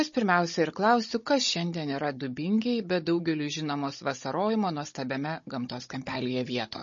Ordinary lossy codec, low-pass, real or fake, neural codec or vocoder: MP3, 32 kbps; 7.2 kHz; fake; codec, 16 kHz, 16 kbps, FunCodec, trained on Chinese and English, 50 frames a second